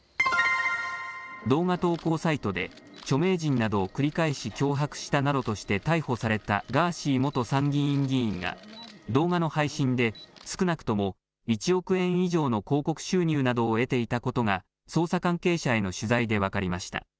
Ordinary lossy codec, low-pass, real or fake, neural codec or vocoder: none; none; real; none